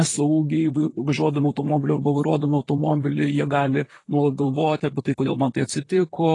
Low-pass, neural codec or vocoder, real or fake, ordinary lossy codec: 10.8 kHz; vocoder, 44.1 kHz, 128 mel bands, Pupu-Vocoder; fake; AAC, 32 kbps